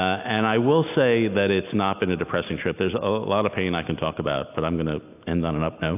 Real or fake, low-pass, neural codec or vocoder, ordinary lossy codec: real; 3.6 kHz; none; AAC, 32 kbps